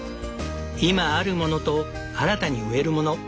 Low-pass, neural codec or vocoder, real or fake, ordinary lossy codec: none; none; real; none